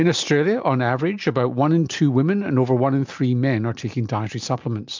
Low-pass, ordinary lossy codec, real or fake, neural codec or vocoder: 7.2 kHz; MP3, 64 kbps; real; none